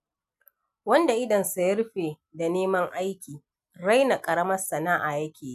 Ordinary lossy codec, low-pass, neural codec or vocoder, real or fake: none; 14.4 kHz; none; real